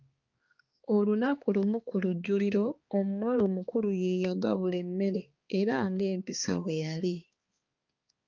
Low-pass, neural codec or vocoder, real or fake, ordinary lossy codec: 7.2 kHz; codec, 16 kHz, 2 kbps, X-Codec, HuBERT features, trained on balanced general audio; fake; Opus, 24 kbps